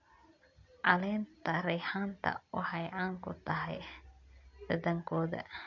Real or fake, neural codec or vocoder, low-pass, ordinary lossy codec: real; none; 7.2 kHz; MP3, 48 kbps